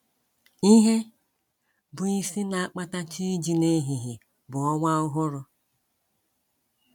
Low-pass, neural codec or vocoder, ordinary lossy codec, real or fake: 19.8 kHz; none; none; real